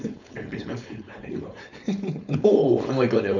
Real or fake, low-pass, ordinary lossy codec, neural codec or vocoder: fake; 7.2 kHz; none; codec, 16 kHz, 4.8 kbps, FACodec